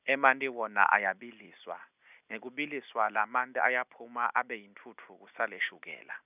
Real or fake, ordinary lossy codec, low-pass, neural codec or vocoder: real; none; 3.6 kHz; none